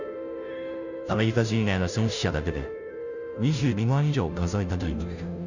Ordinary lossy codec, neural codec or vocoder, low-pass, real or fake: none; codec, 16 kHz, 0.5 kbps, FunCodec, trained on Chinese and English, 25 frames a second; 7.2 kHz; fake